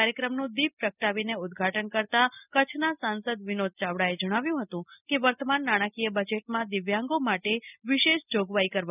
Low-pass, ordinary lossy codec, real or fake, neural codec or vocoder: 3.6 kHz; none; real; none